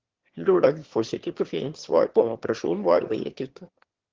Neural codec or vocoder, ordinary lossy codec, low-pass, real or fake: autoencoder, 22.05 kHz, a latent of 192 numbers a frame, VITS, trained on one speaker; Opus, 16 kbps; 7.2 kHz; fake